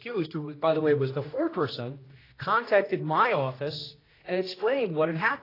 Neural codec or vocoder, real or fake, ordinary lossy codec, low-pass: codec, 16 kHz, 1 kbps, X-Codec, HuBERT features, trained on general audio; fake; AAC, 24 kbps; 5.4 kHz